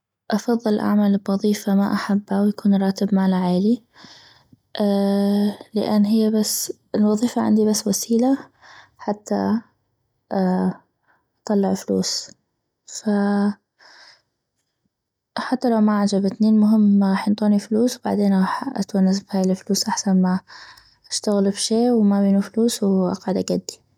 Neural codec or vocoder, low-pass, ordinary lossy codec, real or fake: none; 19.8 kHz; none; real